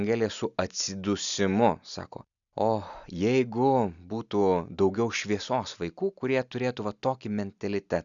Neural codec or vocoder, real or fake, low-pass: none; real; 7.2 kHz